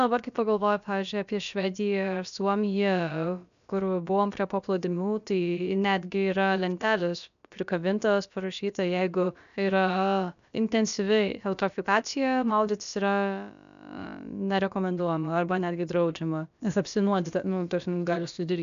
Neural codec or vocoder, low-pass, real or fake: codec, 16 kHz, about 1 kbps, DyCAST, with the encoder's durations; 7.2 kHz; fake